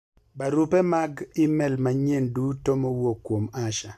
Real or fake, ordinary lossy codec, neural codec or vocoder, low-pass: real; none; none; 14.4 kHz